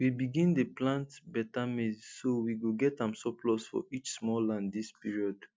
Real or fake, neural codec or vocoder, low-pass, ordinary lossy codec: real; none; none; none